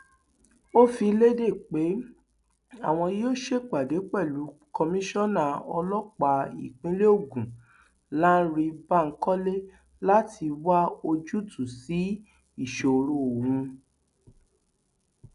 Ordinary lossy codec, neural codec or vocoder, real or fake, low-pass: none; none; real; 10.8 kHz